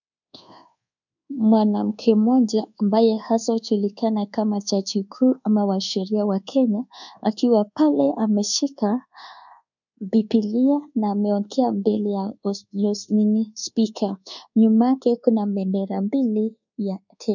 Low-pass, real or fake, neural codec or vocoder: 7.2 kHz; fake; codec, 24 kHz, 1.2 kbps, DualCodec